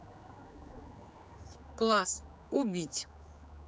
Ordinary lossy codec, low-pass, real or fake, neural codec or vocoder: none; none; fake; codec, 16 kHz, 4 kbps, X-Codec, HuBERT features, trained on general audio